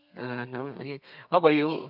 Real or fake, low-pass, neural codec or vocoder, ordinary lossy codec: fake; 5.4 kHz; codec, 32 kHz, 1.9 kbps, SNAC; none